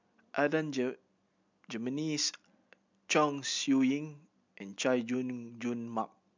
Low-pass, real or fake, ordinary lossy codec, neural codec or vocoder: 7.2 kHz; real; MP3, 64 kbps; none